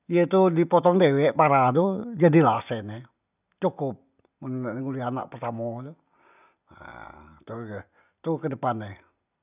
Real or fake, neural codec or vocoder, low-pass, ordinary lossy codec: real; none; 3.6 kHz; none